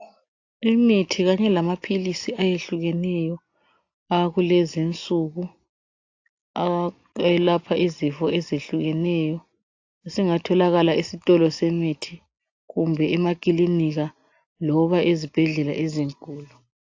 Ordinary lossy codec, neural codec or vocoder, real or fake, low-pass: AAC, 48 kbps; none; real; 7.2 kHz